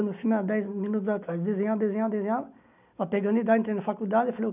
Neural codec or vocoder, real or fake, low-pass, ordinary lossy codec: none; real; 3.6 kHz; none